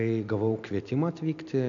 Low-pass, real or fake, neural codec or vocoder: 7.2 kHz; real; none